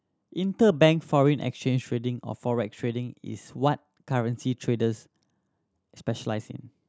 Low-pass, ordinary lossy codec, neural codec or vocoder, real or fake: none; none; none; real